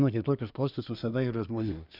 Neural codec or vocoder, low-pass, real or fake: codec, 24 kHz, 1 kbps, SNAC; 5.4 kHz; fake